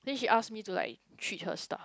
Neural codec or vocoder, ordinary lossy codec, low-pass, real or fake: none; none; none; real